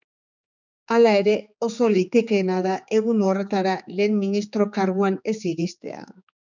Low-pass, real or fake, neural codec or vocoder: 7.2 kHz; fake; codec, 16 kHz, 4 kbps, X-Codec, HuBERT features, trained on general audio